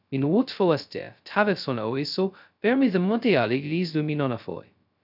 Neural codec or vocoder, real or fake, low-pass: codec, 16 kHz, 0.2 kbps, FocalCodec; fake; 5.4 kHz